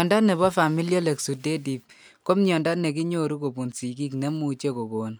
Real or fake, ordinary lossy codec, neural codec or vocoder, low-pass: real; none; none; none